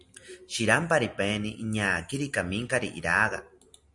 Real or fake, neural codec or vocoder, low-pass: real; none; 10.8 kHz